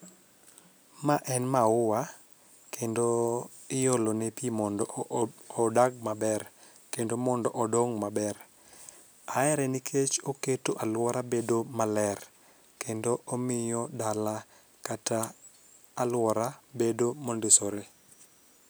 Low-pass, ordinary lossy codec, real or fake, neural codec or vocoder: none; none; real; none